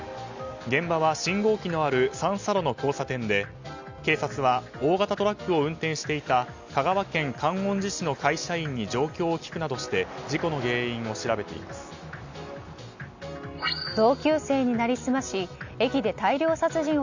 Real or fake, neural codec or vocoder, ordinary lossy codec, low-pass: real; none; Opus, 64 kbps; 7.2 kHz